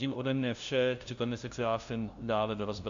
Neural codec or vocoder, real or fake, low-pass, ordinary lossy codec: codec, 16 kHz, 0.5 kbps, FunCodec, trained on LibriTTS, 25 frames a second; fake; 7.2 kHz; Opus, 64 kbps